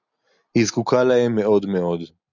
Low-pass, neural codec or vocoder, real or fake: 7.2 kHz; none; real